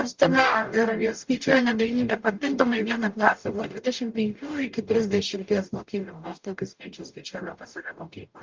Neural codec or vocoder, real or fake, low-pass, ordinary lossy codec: codec, 44.1 kHz, 0.9 kbps, DAC; fake; 7.2 kHz; Opus, 32 kbps